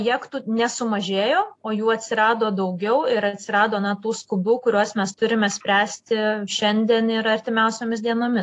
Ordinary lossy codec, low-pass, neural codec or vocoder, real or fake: AAC, 48 kbps; 10.8 kHz; none; real